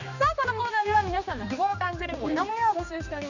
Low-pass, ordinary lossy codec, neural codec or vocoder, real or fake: 7.2 kHz; none; codec, 16 kHz, 2 kbps, X-Codec, HuBERT features, trained on general audio; fake